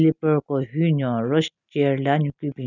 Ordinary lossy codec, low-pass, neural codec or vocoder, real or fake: none; 7.2 kHz; none; real